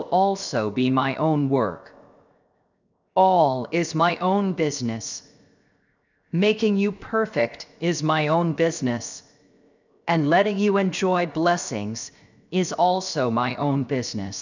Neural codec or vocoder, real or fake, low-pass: codec, 16 kHz, 0.7 kbps, FocalCodec; fake; 7.2 kHz